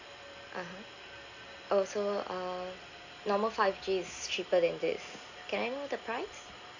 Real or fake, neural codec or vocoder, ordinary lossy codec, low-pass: real; none; AAC, 48 kbps; 7.2 kHz